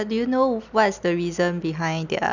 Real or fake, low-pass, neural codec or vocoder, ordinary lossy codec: real; 7.2 kHz; none; none